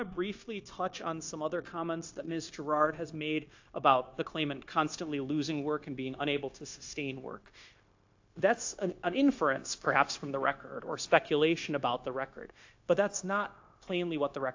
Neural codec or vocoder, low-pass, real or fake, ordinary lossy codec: codec, 16 kHz, 0.9 kbps, LongCat-Audio-Codec; 7.2 kHz; fake; AAC, 48 kbps